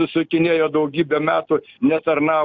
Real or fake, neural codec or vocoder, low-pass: real; none; 7.2 kHz